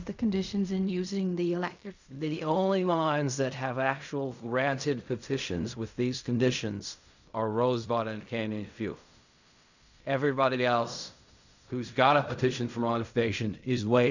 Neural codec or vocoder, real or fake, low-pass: codec, 16 kHz in and 24 kHz out, 0.4 kbps, LongCat-Audio-Codec, fine tuned four codebook decoder; fake; 7.2 kHz